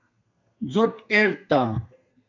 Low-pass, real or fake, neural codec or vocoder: 7.2 kHz; fake; codec, 32 kHz, 1.9 kbps, SNAC